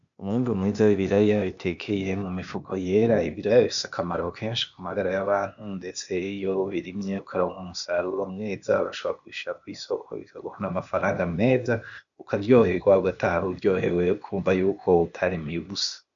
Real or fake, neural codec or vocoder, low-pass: fake; codec, 16 kHz, 0.8 kbps, ZipCodec; 7.2 kHz